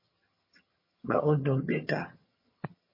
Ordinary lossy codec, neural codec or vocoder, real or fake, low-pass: MP3, 24 kbps; vocoder, 22.05 kHz, 80 mel bands, HiFi-GAN; fake; 5.4 kHz